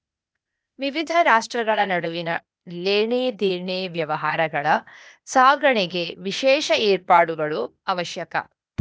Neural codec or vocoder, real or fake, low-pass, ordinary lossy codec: codec, 16 kHz, 0.8 kbps, ZipCodec; fake; none; none